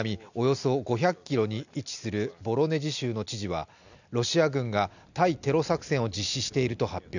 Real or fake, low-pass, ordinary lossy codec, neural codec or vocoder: real; 7.2 kHz; none; none